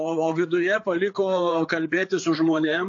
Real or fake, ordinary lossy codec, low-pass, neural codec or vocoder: fake; AAC, 48 kbps; 7.2 kHz; codec, 16 kHz, 4 kbps, FreqCodec, larger model